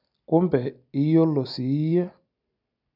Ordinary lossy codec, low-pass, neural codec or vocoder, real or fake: none; 5.4 kHz; none; real